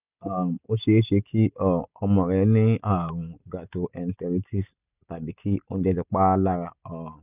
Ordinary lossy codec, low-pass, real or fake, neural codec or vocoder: none; 3.6 kHz; real; none